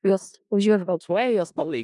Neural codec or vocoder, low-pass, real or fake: codec, 16 kHz in and 24 kHz out, 0.4 kbps, LongCat-Audio-Codec, four codebook decoder; 10.8 kHz; fake